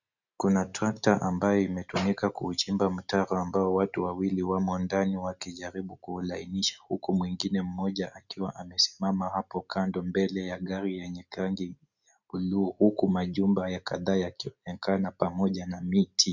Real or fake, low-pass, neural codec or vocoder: real; 7.2 kHz; none